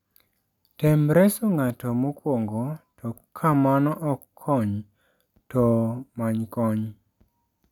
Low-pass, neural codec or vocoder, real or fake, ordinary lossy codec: 19.8 kHz; none; real; none